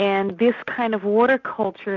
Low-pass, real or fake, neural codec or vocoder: 7.2 kHz; real; none